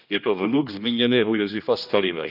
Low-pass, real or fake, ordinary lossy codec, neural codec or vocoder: 5.4 kHz; fake; none; codec, 16 kHz, 1 kbps, X-Codec, HuBERT features, trained on general audio